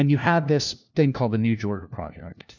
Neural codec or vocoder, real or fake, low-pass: codec, 16 kHz, 1 kbps, FunCodec, trained on LibriTTS, 50 frames a second; fake; 7.2 kHz